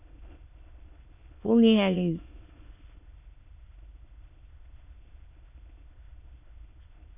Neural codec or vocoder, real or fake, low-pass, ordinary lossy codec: autoencoder, 22.05 kHz, a latent of 192 numbers a frame, VITS, trained on many speakers; fake; 3.6 kHz; AAC, 32 kbps